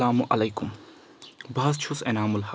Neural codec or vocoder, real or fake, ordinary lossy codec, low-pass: none; real; none; none